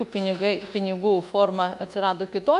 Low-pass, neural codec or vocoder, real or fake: 10.8 kHz; codec, 24 kHz, 1.2 kbps, DualCodec; fake